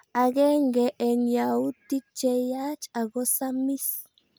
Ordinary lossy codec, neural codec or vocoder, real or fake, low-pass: none; none; real; none